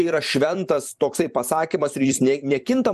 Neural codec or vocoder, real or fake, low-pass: vocoder, 44.1 kHz, 128 mel bands every 256 samples, BigVGAN v2; fake; 14.4 kHz